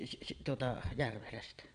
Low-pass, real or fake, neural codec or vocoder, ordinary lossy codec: 9.9 kHz; fake; vocoder, 22.05 kHz, 80 mel bands, Vocos; none